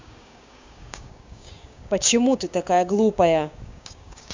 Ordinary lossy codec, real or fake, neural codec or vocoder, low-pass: MP3, 64 kbps; fake; codec, 16 kHz, 6 kbps, DAC; 7.2 kHz